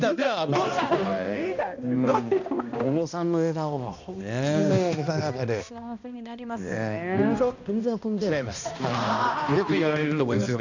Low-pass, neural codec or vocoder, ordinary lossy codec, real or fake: 7.2 kHz; codec, 16 kHz, 1 kbps, X-Codec, HuBERT features, trained on balanced general audio; none; fake